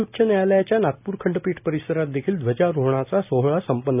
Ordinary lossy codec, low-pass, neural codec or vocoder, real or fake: none; 3.6 kHz; none; real